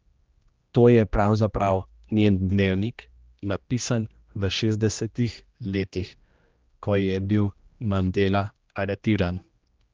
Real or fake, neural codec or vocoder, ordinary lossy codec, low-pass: fake; codec, 16 kHz, 1 kbps, X-Codec, HuBERT features, trained on general audio; Opus, 24 kbps; 7.2 kHz